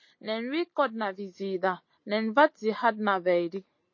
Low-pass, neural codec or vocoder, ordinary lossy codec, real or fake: 7.2 kHz; none; MP3, 32 kbps; real